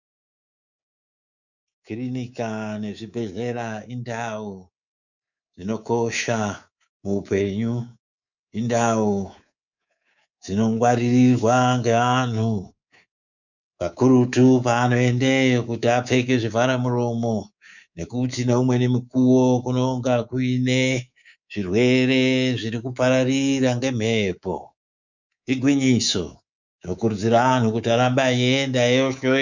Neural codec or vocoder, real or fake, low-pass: codec, 24 kHz, 3.1 kbps, DualCodec; fake; 7.2 kHz